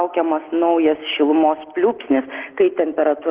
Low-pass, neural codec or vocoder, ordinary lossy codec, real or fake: 3.6 kHz; none; Opus, 16 kbps; real